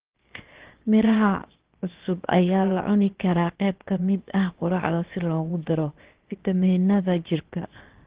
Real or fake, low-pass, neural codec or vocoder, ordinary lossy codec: fake; 3.6 kHz; codec, 16 kHz, 0.7 kbps, FocalCodec; Opus, 16 kbps